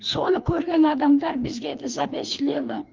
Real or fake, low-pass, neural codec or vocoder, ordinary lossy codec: fake; 7.2 kHz; codec, 16 kHz, 2 kbps, FreqCodec, larger model; Opus, 24 kbps